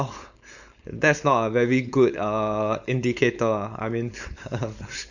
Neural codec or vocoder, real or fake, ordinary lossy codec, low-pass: codec, 16 kHz, 4.8 kbps, FACodec; fake; none; 7.2 kHz